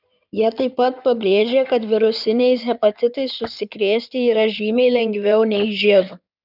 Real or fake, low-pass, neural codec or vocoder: fake; 5.4 kHz; codec, 16 kHz in and 24 kHz out, 2.2 kbps, FireRedTTS-2 codec